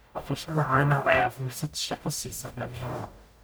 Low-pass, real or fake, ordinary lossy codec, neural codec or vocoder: none; fake; none; codec, 44.1 kHz, 0.9 kbps, DAC